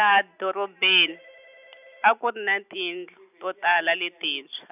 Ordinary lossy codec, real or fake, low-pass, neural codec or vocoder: none; real; 3.6 kHz; none